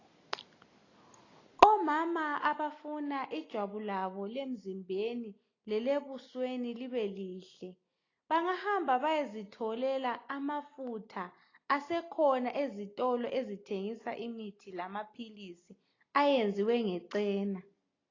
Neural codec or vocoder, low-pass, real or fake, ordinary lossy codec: none; 7.2 kHz; real; AAC, 32 kbps